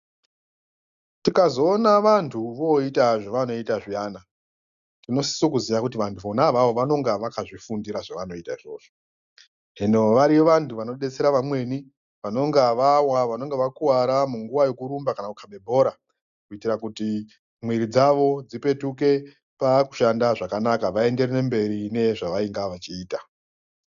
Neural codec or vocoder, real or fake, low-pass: none; real; 7.2 kHz